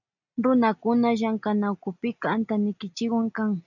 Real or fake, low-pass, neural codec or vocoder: real; 7.2 kHz; none